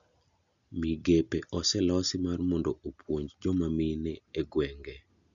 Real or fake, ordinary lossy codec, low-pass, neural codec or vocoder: real; none; 7.2 kHz; none